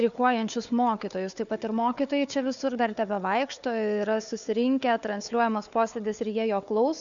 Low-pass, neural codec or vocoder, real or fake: 7.2 kHz; codec, 16 kHz, 4 kbps, FunCodec, trained on Chinese and English, 50 frames a second; fake